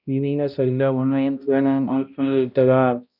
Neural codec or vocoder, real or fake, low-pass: codec, 16 kHz, 0.5 kbps, X-Codec, HuBERT features, trained on balanced general audio; fake; 5.4 kHz